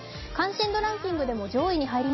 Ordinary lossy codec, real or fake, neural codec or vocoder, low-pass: MP3, 24 kbps; real; none; 7.2 kHz